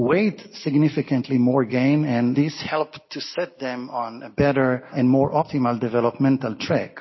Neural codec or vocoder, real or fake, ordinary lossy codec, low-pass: none; real; MP3, 24 kbps; 7.2 kHz